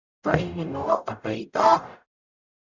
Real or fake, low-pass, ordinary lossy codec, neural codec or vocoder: fake; 7.2 kHz; Opus, 64 kbps; codec, 44.1 kHz, 0.9 kbps, DAC